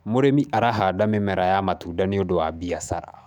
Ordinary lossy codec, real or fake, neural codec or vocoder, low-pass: none; fake; autoencoder, 48 kHz, 128 numbers a frame, DAC-VAE, trained on Japanese speech; 19.8 kHz